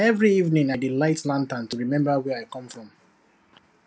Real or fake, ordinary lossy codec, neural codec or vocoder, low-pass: real; none; none; none